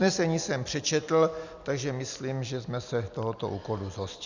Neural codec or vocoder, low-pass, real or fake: none; 7.2 kHz; real